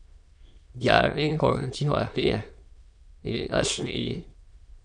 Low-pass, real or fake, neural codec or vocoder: 9.9 kHz; fake; autoencoder, 22.05 kHz, a latent of 192 numbers a frame, VITS, trained on many speakers